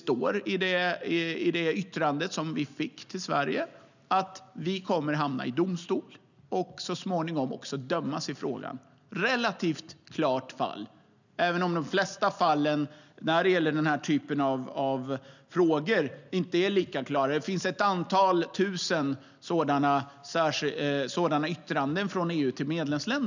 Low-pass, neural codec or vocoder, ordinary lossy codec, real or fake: 7.2 kHz; none; none; real